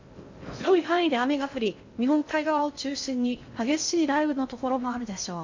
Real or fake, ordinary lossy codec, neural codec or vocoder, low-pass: fake; MP3, 48 kbps; codec, 16 kHz in and 24 kHz out, 0.6 kbps, FocalCodec, streaming, 4096 codes; 7.2 kHz